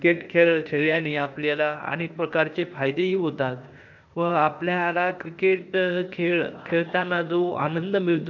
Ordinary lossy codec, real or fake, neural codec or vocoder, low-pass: none; fake; codec, 16 kHz, 0.8 kbps, ZipCodec; 7.2 kHz